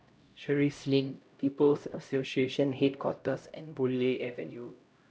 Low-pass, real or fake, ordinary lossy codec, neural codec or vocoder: none; fake; none; codec, 16 kHz, 0.5 kbps, X-Codec, HuBERT features, trained on LibriSpeech